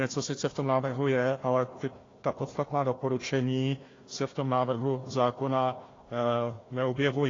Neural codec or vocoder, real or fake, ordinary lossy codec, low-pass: codec, 16 kHz, 1 kbps, FunCodec, trained on Chinese and English, 50 frames a second; fake; AAC, 32 kbps; 7.2 kHz